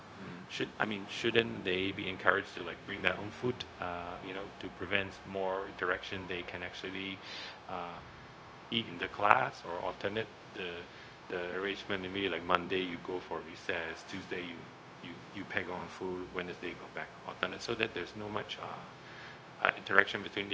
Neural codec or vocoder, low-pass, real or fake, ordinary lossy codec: codec, 16 kHz, 0.4 kbps, LongCat-Audio-Codec; none; fake; none